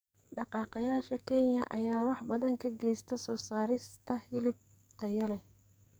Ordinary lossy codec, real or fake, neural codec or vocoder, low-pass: none; fake; codec, 44.1 kHz, 2.6 kbps, SNAC; none